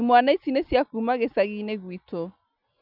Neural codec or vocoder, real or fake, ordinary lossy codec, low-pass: none; real; none; 5.4 kHz